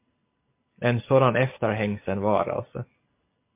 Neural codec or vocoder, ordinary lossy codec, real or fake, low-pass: none; MP3, 24 kbps; real; 3.6 kHz